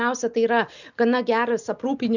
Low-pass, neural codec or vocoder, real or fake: 7.2 kHz; none; real